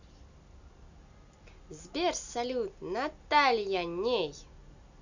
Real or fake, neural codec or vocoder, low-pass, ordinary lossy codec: real; none; 7.2 kHz; none